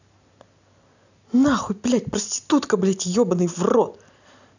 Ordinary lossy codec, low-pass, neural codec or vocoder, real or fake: none; 7.2 kHz; none; real